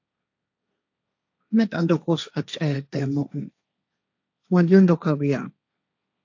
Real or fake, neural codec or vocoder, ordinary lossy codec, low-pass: fake; codec, 16 kHz, 1.1 kbps, Voila-Tokenizer; AAC, 48 kbps; 7.2 kHz